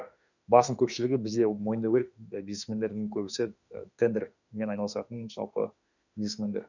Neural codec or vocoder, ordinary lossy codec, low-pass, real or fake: autoencoder, 48 kHz, 32 numbers a frame, DAC-VAE, trained on Japanese speech; none; 7.2 kHz; fake